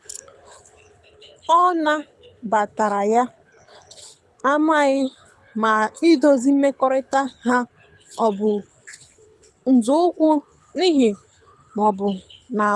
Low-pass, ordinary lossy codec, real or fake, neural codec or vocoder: none; none; fake; codec, 24 kHz, 6 kbps, HILCodec